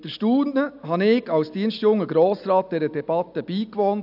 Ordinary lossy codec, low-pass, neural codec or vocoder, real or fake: AAC, 48 kbps; 5.4 kHz; none; real